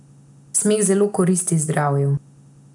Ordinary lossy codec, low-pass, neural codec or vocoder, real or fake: none; 10.8 kHz; none; real